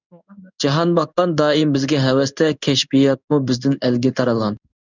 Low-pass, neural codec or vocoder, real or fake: 7.2 kHz; codec, 16 kHz in and 24 kHz out, 1 kbps, XY-Tokenizer; fake